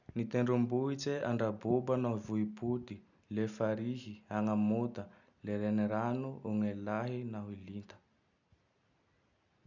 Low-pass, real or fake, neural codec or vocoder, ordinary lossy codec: 7.2 kHz; real; none; none